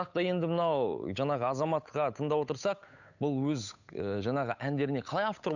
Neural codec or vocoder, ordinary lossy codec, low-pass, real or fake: codec, 16 kHz, 8 kbps, FunCodec, trained on Chinese and English, 25 frames a second; none; 7.2 kHz; fake